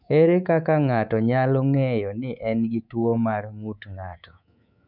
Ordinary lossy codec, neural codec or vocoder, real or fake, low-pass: none; codec, 24 kHz, 3.1 kbps, DualCodec; fake; 5.4 kHz